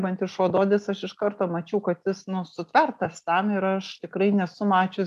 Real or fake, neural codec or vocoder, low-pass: real; none; 14.4 kHz